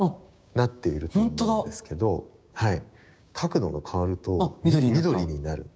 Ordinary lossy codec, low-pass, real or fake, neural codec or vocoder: none; none; fake; codec, 16 kHz, 6 kbps, DAC